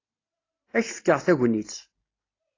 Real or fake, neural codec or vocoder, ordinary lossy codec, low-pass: real; none; AAC, 32 kbps; 7.2 kHz